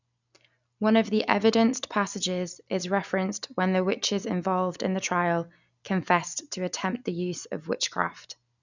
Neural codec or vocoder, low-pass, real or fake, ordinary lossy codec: none; 7.2 kHz; real; none